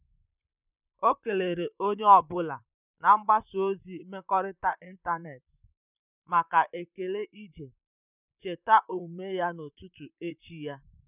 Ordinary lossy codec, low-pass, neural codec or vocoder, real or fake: none; 3.6 kHz; vocoder, 44.1 kHz, 128 mel bands, Pupu-Vocoder; fake